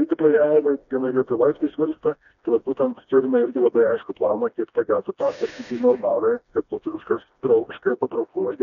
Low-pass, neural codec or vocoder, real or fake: 7.2 kHz; codec, 16 kHz, 1 kbps, FreqCodec, smaller model; fake